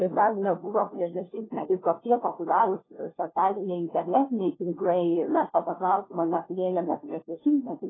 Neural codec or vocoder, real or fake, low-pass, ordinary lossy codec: codec, 16 kHz, 1 kbps, FunCodec, trained on LibriTTS, 50 frames a second; fake; 7.2 kHz; AAC, 16 kbps